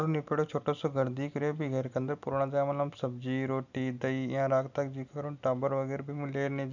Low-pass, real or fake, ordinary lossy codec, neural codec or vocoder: 7.2 kHz; real; none; none